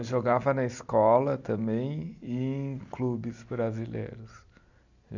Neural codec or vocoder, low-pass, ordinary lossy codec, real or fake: none; 7.2 kHz; none; real